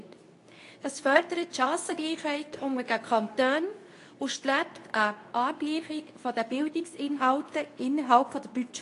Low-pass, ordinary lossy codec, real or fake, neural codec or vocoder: 10.8 kHz; AAC, 48 kbps; fake; codec, 24 kHz, 0.9 kbps, WavTokenizer, medium speech release version 2